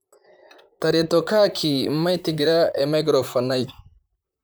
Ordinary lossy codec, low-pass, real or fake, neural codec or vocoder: none; none; fake; vocoder, 44.1 kHz, 128 mel bands, Pupu-Vocoder